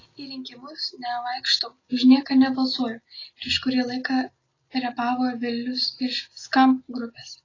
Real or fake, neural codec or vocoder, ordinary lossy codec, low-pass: real; none; AAC, 32 kbps; 7.2 kHz